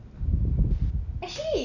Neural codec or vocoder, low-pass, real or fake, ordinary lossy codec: none; 7.2 kHz; real; none